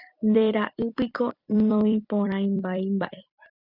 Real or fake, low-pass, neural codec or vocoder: fake; 5.4 kHz; vocoder, 44.1 kHz, 128 mel bands every 256 samples, BigVGAN v2